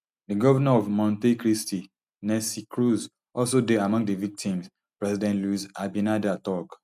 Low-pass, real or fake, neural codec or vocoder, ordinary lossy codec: 14.4 kHz; real; none; none